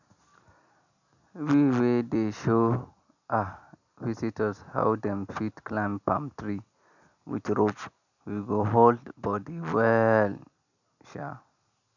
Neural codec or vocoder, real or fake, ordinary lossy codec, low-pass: none; real; none; 7.2 kHz